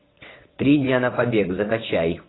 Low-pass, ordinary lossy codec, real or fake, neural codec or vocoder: 7.2 kHz; AAC, 16 kbps; fake; vocoder, 22.05 kHz, 80 mel bands, WaveNeXt